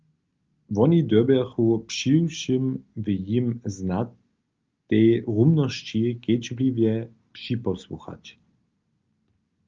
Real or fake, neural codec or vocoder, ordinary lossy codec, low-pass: real; none; Opus, 32 kbps; 7.2 kHz